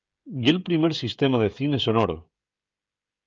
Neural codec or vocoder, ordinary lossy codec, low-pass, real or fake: codec, 16 kHz, 16 kbps, FreqCodec, smaller model; Opus, 24 kbps; 7.2 kHz; fake